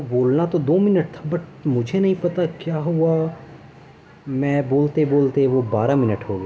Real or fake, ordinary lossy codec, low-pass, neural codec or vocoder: real; none; none; none